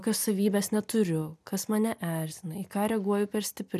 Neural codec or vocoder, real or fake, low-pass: none; real; 14.4 kHz